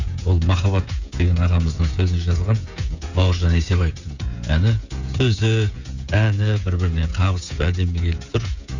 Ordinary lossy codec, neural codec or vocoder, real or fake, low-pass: none; codec, 16 kHz, 16 kbps, FreqCodec, smaller model; fake; 7.2 kHz